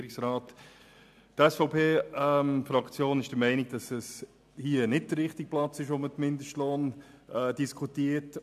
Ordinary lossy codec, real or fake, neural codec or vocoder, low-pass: AAC, 96 kbps; real; none; 14.4 kHz